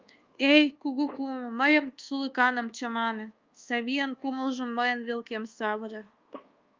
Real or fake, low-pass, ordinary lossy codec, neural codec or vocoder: fake; 7.2 kHz; Opus, 24 kbps; codec, 24 kHz, 1.2 kbps, DualCodec